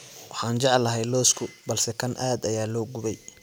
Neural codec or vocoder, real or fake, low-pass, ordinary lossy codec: none; real; none; none